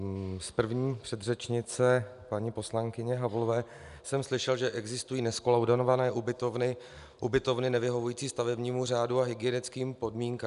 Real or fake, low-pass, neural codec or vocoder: real; 10.8 kHz; none